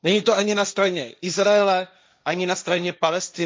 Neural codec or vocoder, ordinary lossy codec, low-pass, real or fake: codec, 16 kHz, 1.1 kbps, Voila-Tokenizer; none; none; fake